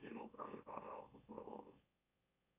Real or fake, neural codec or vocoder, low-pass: fake; autoencoder, 44.1 kHz, a latent of 192 numbers a frame, MeloTTS; 3.6 kHz